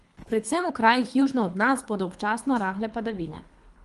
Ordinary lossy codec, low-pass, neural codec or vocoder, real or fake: Opus, 32 kbps; 10.8 kHz; codec, 24 kHz, 3 kbps, HILCodec; fake